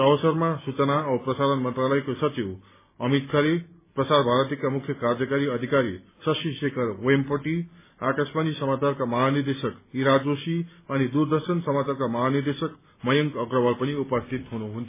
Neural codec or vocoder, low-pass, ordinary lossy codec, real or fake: none; 3.6 kHz; MP3, 16 kbps; real